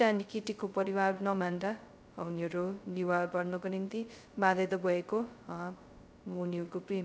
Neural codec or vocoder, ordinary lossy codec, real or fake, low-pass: codec, 16 kHz, 0.2 kbps, FocalCodec; none; fake; none